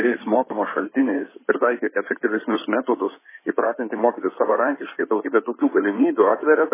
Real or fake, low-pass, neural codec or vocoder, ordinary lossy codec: fake; 3.6 kHz; codec, 16 kHz in and 24 kHz out, 2.2 kbps, FireRedTTS-2 codec; MP3, 16 kbps